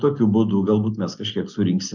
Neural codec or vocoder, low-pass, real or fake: none; 7.2 kHz; real